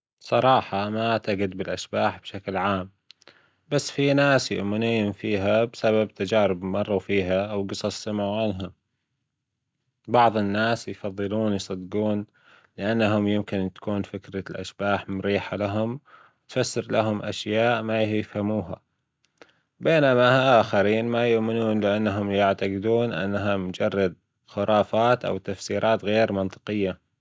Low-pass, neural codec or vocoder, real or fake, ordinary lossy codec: none; none; real; none